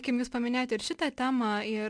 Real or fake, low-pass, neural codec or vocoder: real; 9.9 kHz; none